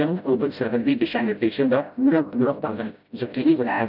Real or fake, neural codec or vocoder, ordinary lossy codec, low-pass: fake; codec, 16 kHz, 0.5 kbps, FreqCodec, smaller model; none; 5.4 kHz